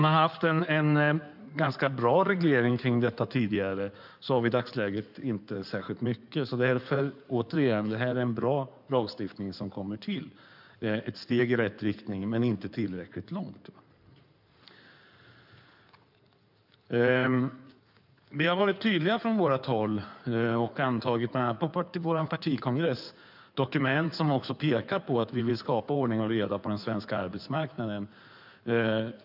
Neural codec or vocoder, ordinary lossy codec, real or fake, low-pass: codec, 16 kHz in and 24 kHz out, 2.2 kbps, FireRedTTS-2 codec; none; fake; 5.4 kHz